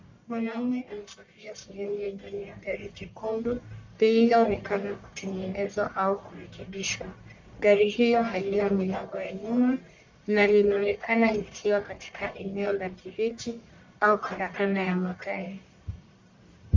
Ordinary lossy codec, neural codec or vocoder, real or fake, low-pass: MP3, 64 kbps; codec, 44.1 kHz, 1.7 kbps, Pupu-Codec; fake; 7.2 kHz